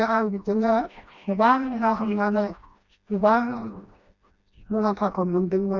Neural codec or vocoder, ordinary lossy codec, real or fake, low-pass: codec, 16 kHz, 1 kbps, FreqCodec, smaller model; none; fake; 7.2 kHz